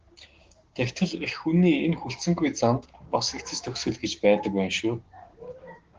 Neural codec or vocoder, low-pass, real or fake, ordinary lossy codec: codec, 16 kHz, 6 kbps, DAC; 7.2 kHz; fake; Opus, 16 kbps